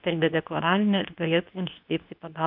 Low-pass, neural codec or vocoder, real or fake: 5.4 kHz; codec, 24 kHz, 0.9 kbps, WavTokenizer, medium speech release version 2; fake